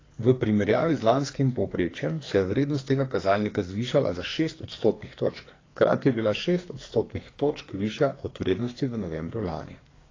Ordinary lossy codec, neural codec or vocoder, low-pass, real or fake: AAC, 32 kbps; codec, 44.1 kHz, 2.6 kbps, SNAC; 7.2 kHz; fake